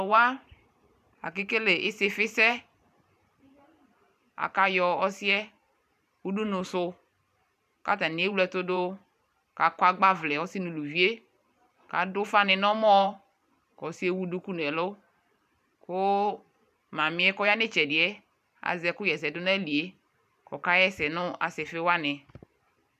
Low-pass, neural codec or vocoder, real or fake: 14.4 kHz; vocoder, 44.1 kHz, 128 mel bands every 512 samples, BigVGAN v2; fake